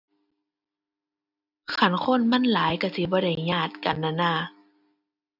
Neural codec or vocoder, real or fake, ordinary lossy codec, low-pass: none; real; none; 5.4 kHz